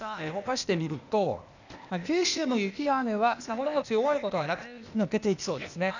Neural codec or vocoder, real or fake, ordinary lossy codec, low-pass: codec, 16 kHz, 0.8 kbps, ZipCodec; fake; none; 7.2 kHz